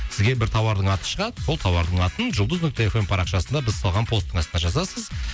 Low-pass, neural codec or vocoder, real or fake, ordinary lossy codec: none; none; real; none